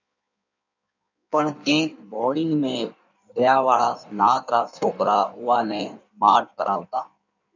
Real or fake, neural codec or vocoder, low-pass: fake; codec, 16 kHz in and 24 kHz out, 1.1 kbps, FireRedTTS-2 codec; 7.2 kHz